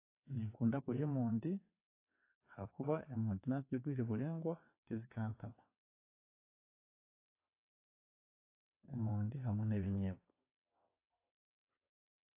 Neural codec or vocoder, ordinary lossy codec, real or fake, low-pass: vocoder, 22.05 kHz, 80 mel bands, WaveNeXt; AAC, 24 kbps; fake; 3.6 kHz